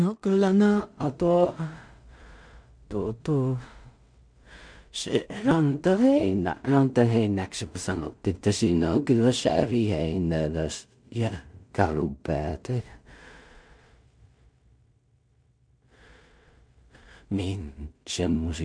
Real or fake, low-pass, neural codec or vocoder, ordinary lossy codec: fake; 9.9 kHz; codec, 16 kHz in and 24 kHz out, 0.4 kbps, LongCat-Audio-Codec, two codebook decoder; MP3, 64 kbps